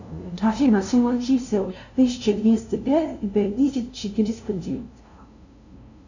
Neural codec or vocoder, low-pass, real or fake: codec, 16 kHz, 0.5 kbps, FunCodec, trained on LibriTTS, 25 frames a second; 7.2 kHz; fake